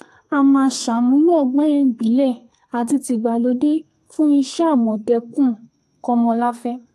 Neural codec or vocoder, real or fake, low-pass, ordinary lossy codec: codec, 32 kHz, 1.9 kbps, SNAC; fake; 14.4 kHz; AAC, 64 kbps